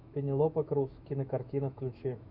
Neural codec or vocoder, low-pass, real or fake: autoencoder, 48 kHz, 128 numbers a frame, DAC-VAE, trained on Japanese speech; 5.4 kHz; fake